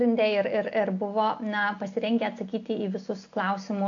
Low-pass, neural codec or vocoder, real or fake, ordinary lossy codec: 7.2 kHz; none; real; AAC, 48 kbps